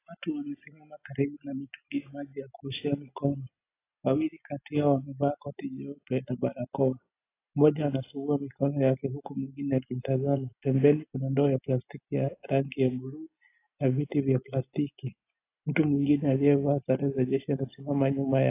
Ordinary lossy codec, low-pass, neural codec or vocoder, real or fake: AAC, 24 kbps; 3.6 kHz; none; real